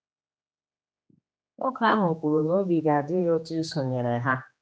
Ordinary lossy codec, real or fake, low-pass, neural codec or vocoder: none; fake; none; codec, 16 kHz, 2 kbps, X-Codec, HuBERT features, trained on general audio